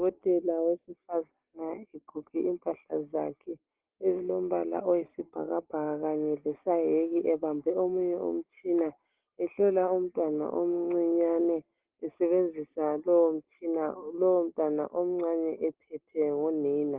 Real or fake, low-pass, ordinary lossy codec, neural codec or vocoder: real; 3.6 kHz; Opus, 16 kbps; none